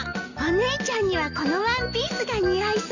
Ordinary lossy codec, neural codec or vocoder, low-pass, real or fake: none; none; 7.2 kHz; real